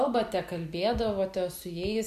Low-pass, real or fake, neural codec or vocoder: 14.4 kHz; real; none